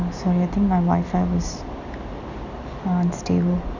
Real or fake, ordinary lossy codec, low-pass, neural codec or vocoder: real; none; 7.2 kHz; none